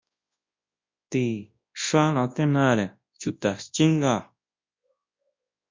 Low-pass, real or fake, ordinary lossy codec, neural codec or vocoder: 7.2 kHz; fake; MP3, 48 kbps; codec, 24 kHz, 0.9 kbps, WavTokenizer, large speech release